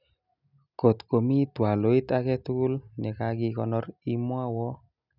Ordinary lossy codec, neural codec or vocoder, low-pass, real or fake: none; none; 5.4 kHz; real